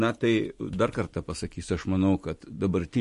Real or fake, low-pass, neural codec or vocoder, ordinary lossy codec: real; 14.4 kHz; none; MP3, 48 kbps